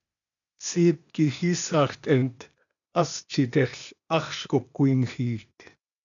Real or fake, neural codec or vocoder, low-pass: fake; codec, 16 kHz, 0.8 kbps, ZipCodec; 7.2 kHz